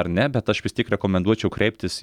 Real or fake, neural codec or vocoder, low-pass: real; none; 19.8 kHz